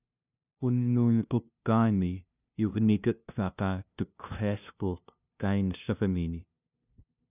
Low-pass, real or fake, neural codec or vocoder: 3.6 kHz; fake; codec, 16 kHz, 0.5 kbps, FunCodec, trained on LibriTTS, 25 frames a second